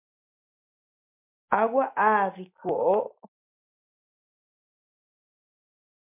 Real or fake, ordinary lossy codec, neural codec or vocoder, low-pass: real; MP3, 32 kbps; none; 3.6 kHz